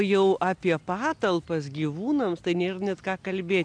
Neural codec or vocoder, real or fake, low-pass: none; real; 9.9 kHz